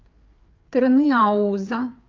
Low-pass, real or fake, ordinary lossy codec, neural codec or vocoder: 7.2 kHz; fake; Opus, 24 kbps; codec, 16 kHz, 4 kbps, FreqCodec, larger model